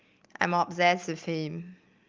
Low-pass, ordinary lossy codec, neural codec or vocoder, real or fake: 7.2 kHz; Opus, 24 kbps; none; real